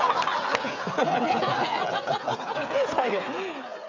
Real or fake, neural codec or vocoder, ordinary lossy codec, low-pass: fake; codec, 16 kHz, 16 kbps, FreqCodec, smaller model; AAC, 48 kbps; 7.2 kHz